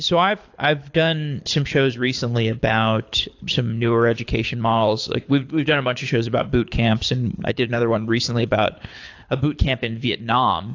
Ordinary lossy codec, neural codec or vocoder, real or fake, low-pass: AAC, 48 kbps; codec, 24 kHz, 6 kbps, HILCodec; fake; 7.2 kHz